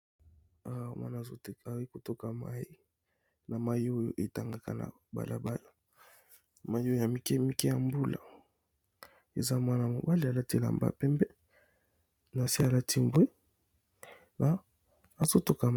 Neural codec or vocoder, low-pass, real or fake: none; 19.8 kHz; real